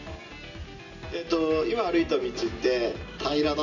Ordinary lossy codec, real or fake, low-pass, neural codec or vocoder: none; real; 7.2 kHz; none